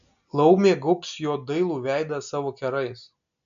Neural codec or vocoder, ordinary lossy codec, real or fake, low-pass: none; MP3, 96 kbps; real; 7.2 kHz